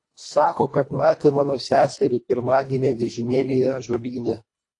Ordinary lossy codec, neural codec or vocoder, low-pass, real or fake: AAC, 48 kbps; codec, 24 kHz, 1.5 kbps, HILCodec; 10.8 kHz; fake